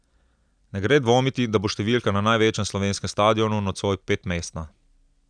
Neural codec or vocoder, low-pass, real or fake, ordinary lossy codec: none; 9.9 kHz; real; none